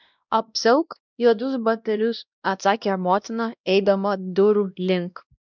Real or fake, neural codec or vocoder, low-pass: fake; codec, 16 kHz, 1 kbps, X-Codec, WavLM features, trained on Multilingual LibriSpeech; 7.2 kHz